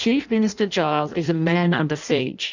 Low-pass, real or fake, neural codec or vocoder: 7.2 kHz; fake; codec, 16 kHz in and 24 kHz out, 0.6 kbps, FireRedTTS-2 codec